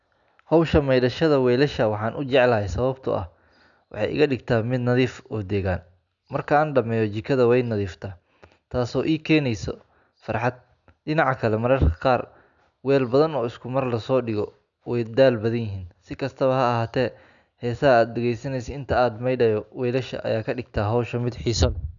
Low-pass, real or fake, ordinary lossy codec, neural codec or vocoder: 7.2 kHz; real; none; none